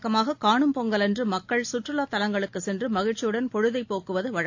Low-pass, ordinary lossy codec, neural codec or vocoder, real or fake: 7.2 kHz; AAC, 48 kbps; none; real